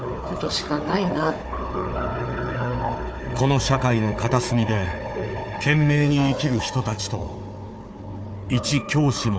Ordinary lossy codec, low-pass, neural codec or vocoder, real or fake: none; none; codec, 16 kHz, 4 kbps, FunCodec, trained on Chinese and English, 50 frames a second; fake